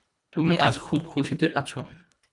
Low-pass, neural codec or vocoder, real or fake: 10.8 kHz; codec, 24 kHz, 1.5 kbps, HILCodec; fake